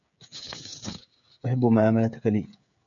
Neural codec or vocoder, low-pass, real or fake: codec, 16 kHz, 16 kbps, FreqCodec, smaller model; 7.2 kHz; fake